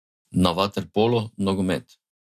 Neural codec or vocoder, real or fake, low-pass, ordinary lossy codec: none; real; 14.4 kHz; none